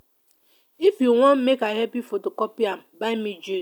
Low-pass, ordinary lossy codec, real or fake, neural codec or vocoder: 19.8 kHz; none; real; none